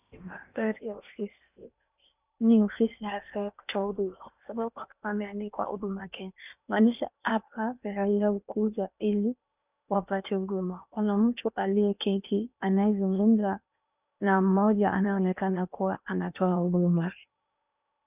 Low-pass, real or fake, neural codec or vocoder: 3.6 kHz; fake; codec, 16 kHz in and 24 kHz out, 0.8 kbps, FocalCodec, streaming, 65536 codes